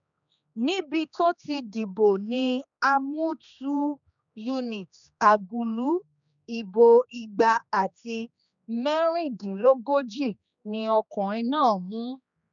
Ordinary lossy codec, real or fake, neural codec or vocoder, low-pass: none; fake; codec, 16 kHz, 2 kbps, X-Codec, HuBERT features, trained on general audio; 7.2 kHz